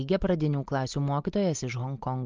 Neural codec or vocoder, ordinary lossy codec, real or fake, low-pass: none; Opus, 24 kbps; real; 7.2 kHz